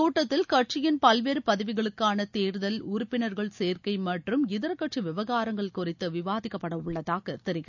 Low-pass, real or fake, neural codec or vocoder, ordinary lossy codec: 7.2 kHz; real; none; none